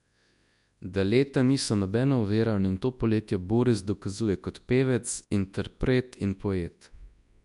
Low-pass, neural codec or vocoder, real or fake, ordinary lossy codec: 10.8 kHz; codec, 24 kHz, 0.9 kbps, WavTokenizer, large speech release; fake; none